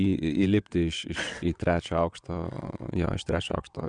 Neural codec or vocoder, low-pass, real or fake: vocoder, 22.05 kHz, 80 mel bands, WaveNeXt; 9.9 kHz; fake